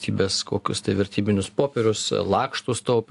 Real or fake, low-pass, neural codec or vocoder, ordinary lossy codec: real; 10.8 kHz; none; AAC, 64 kbps